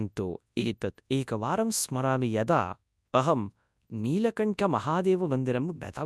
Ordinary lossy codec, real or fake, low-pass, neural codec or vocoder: none; fake; none; codec, 24 kHz, 0.9 kbps, WavTokenizer, large speech release